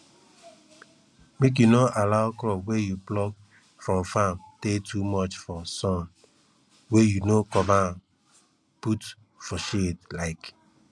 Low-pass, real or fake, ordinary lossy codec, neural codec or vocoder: none; real; none; none